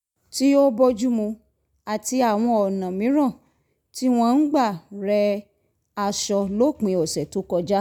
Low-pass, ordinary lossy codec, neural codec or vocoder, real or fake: 19.8 kHz; none; none; real